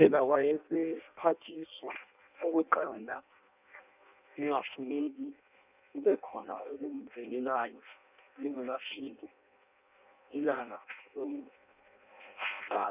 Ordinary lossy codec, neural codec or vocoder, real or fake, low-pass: none; codec, 16 kHz in and 24 kHz out, 0.6 kbps, FireRedTTS-2 codec; fake; 3.6 kHz